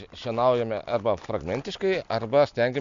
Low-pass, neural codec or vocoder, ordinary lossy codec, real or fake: 7.2 kHz; none; MP3, 64 kbps; real